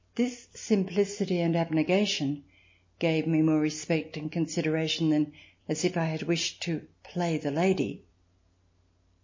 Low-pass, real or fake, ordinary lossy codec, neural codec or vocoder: 7.2 kHz; real; MP3, 32 kbps; none